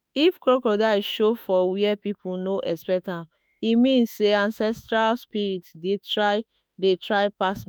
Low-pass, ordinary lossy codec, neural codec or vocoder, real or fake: none; none; autoencoder, 48 kHz, 32 numbers a frame, DAC-VAE, trained on Japanese speech; fake